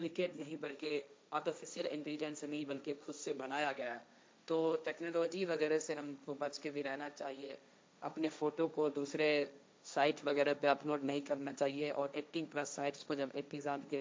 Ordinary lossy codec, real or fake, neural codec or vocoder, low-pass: none; fake; codec, 16 kHz, 1.1 kbps, Voila-Tokenizer; none